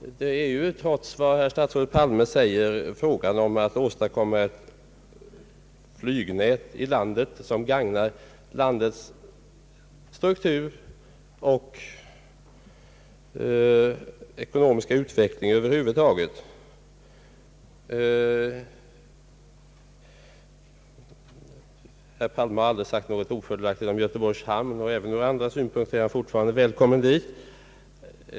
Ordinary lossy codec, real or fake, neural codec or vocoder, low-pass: none; real; none; none